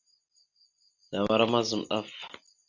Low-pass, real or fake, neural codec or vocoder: 7.2 kHz; real; none